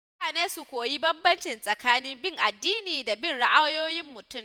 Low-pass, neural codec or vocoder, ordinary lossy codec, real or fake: none; vocoder, 48 kHz, 128 mel bands, Vocos; none; fake